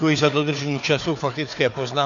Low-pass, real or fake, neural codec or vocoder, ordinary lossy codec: 7.2 kHz; fake; codec, 16 kHz, 4 kbps, FunCodec, trained on Chinese and English, 50 frames a second; AAC, 48 kbps